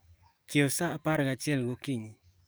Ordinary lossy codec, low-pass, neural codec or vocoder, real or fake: none; none; codec, 44.1 kHz, 7.8 kbps, DAC; fake